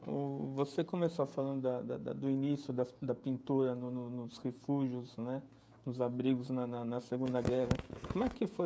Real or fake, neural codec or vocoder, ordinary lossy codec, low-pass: fake; codec, 16 kHz, 16 kbps, FreqCodec, smaller model; none; none